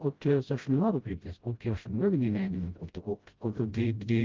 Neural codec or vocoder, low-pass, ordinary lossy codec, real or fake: codec, 16 kHz, 0.5 kbps, FreqCodec, smaller model; 7.2 kHz; Opus, 32 kbps; fake